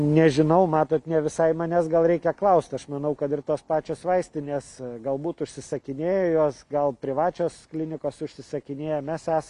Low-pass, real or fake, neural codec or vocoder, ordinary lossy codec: 10.8 kHz; real; none; MP3, 96 kbps